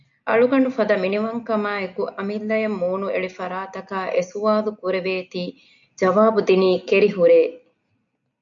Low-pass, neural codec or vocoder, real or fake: 7.2 kHz; none; real